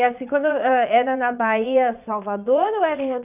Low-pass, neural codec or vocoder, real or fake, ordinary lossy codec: 3.6 kHz; vocoder, 22.05 kHz, 80 mel bands, Vocos; fake; none